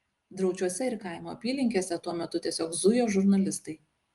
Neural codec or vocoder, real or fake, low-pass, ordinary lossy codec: none; real; 10.8 kHz; Opus, 32 kbps